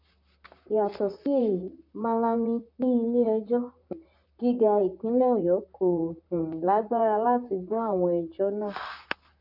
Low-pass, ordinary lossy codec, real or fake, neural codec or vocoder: 5.4 kHz; none; fake; vocoder, 44.1 kHz, 128 mel bands, Pupu-Vocoder